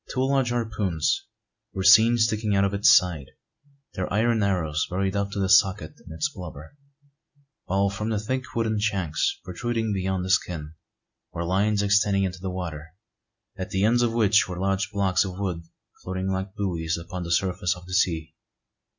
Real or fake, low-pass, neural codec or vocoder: real; 7.2 kHz; none